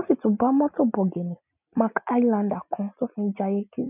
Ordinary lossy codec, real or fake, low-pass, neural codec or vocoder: none; real; 3.6 kHz; none